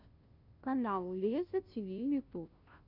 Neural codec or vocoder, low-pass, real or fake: codec, 16 kHz, 0.5 kbps, FunCodec, trained on Chinese and English, 25 frames a second; 5.4 kHz; fake